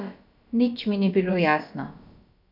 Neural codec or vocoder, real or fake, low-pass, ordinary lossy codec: codec, 16 kHz, about 1 kbps, DyCAST, with the encoder's durations; fake; 5.4 kHz; none